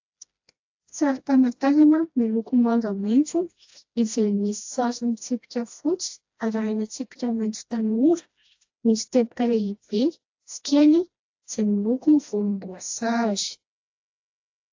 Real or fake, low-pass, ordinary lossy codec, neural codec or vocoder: fake; 7.2 kHz; AAC, 48 kbps; codec, 16 kHz, 1 kbps, FreqCodec, smaller model